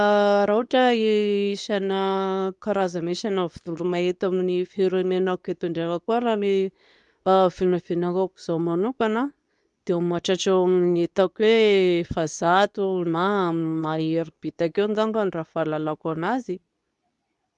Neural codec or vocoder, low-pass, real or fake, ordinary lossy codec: codec, 24 kHz, 0.9 kbps, WavTokenizer, medium speech release version 2; 10.8 kHz; fake; none